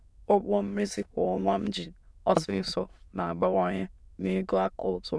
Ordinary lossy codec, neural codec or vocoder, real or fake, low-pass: none; autoencoder, 22.05 kHz, a latent of 192 numbers a frame, VITS, trained on many speakers; fake; none